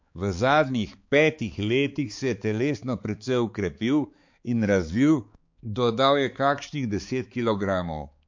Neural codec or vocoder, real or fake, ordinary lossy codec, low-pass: codec, 16 kHz, 4 kbps, X-Codec, HuBERT features, trained on balanced general audio; fake; MP3, 48 kbps; 7.2 kHz